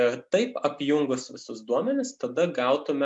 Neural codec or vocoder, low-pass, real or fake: none; 10.8 kHz; real